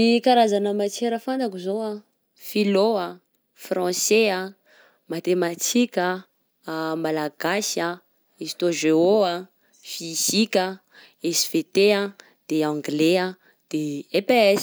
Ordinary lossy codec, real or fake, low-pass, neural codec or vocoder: none; real; none; none